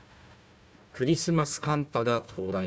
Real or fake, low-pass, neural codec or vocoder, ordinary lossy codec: fake; none; codec, 16 kHz, 1 kbps, FunCodec, trained on Chinese and English, 50 frames a second; none